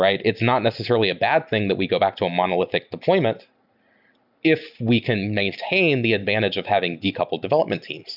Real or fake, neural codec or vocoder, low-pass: real; none; 5.4 kHz